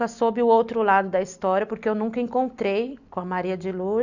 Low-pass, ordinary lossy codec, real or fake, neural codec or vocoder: 7.2 kHz; none; real; none